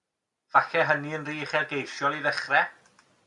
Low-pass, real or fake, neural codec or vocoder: 10.8 kHz; real; none